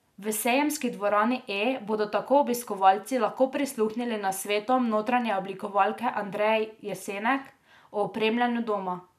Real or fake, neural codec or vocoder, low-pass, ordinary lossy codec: real; none; 14.4 kHz; none